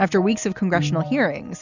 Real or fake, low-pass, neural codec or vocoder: real; 7.2 kHz; none